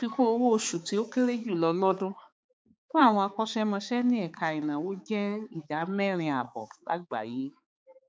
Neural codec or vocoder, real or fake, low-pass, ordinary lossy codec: codec, 16 kHz, 4 kbps, X-Codec, HuBERT features, trained on balanced general audio; fake; none; none